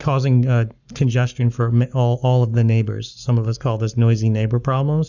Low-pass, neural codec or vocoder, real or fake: 7.2 kHz; codec, 44.1 kHz, 7.8 kbps, Pupu-Codec; fake